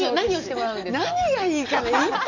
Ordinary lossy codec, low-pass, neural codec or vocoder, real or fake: none; 7.2 kHz; none; real